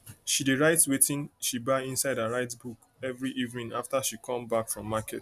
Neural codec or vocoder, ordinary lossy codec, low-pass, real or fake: none; none; 14.4 kHz; real